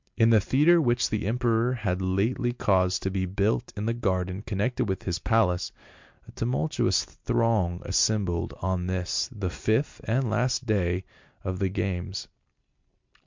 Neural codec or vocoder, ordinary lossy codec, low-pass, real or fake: none; MP3, 64 kbps; 7.2 kHz; real